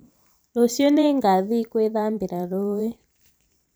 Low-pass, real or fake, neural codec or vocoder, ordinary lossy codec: none; fake; vocoder, 44.1 kHz, 128 mel bands every 512 samples, BigVGAN v2; none